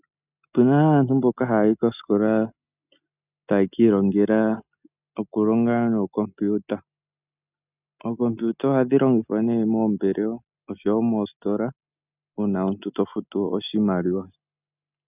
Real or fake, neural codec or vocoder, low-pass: real; none; 3.6 kHz